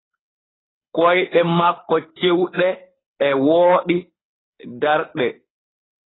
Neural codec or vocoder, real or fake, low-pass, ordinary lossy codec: codec, 24 kHz, 6 kbps, HILCodec; fake; 7.2 kHz; AAC, 16 kbps